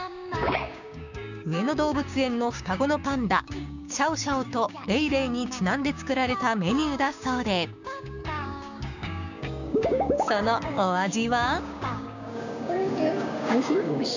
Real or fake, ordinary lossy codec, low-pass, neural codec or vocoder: fake; none; 7.2 kHz; codec, 16 kHz, 6 kbps, DAC